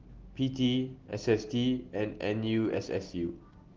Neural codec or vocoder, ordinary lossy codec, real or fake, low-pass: none; Opus, 16 kbps; real; 7.2 kHz